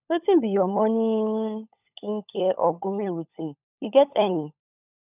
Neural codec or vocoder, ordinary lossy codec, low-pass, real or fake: codec, 16 kHz, 16 kbps, FunCodec, trained on LibriTTS, 50 frames a second; none; 3.6 kHz; fake